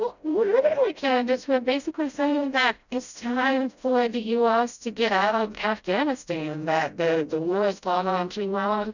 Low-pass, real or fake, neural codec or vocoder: 7.2 kHz; fake; codec, 16 kHz, 0.5 kbps, FreqCodec, smaller model